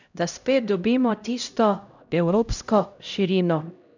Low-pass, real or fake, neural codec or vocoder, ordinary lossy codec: 7.2 kHz; fake; codec, 16 kHz, 0.5 kbps, X-Codec, HuBERT features, trained on LibriSpeech; none